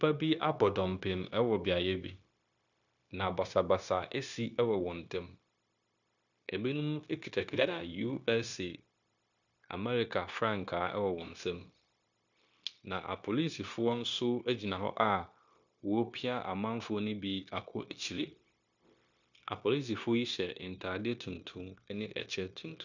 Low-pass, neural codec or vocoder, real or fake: 7.2 kHz; codec, 16 kHz, 0.9 kbps, LongCat-Audio-Codec; fake